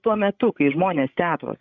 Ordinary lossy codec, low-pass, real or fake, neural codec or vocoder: MP3, 48 kbps; 7.2 kHz; fake; codec, 16 kHz, 16 kbps, FreqCodec, larger model